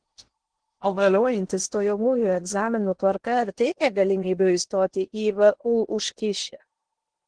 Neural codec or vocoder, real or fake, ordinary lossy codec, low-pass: codec, 16 kHz in and 24 kHz out, 0.8 kbps, FocalCodec, streaming, 65536 codes; fake; Opus, 16 kbps; 9.9 kHz